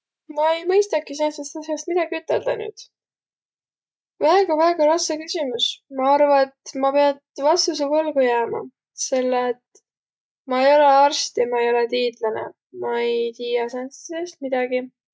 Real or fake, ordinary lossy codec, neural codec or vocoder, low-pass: real; none; none; none